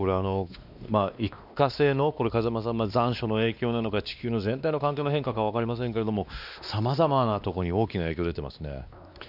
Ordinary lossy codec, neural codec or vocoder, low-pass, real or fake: none; codec, 16 kHz, 2 kbps, X-Codec, WavLM features, trained on Multilingual LibriSpeech; 5.4 kHz; fake